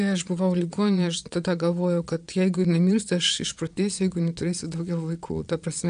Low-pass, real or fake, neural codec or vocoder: 9.9 kHz; fake; vocoder, 22.05 kHz, 80 mel bands, Vocos